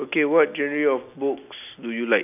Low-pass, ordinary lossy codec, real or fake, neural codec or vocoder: 3.6 kHz; none; real; none